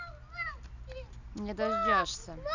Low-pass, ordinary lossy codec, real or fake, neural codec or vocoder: 7.2 kHz; none; real; none